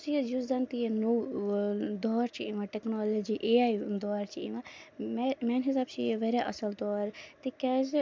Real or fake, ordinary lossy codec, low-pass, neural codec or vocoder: real; none; none; none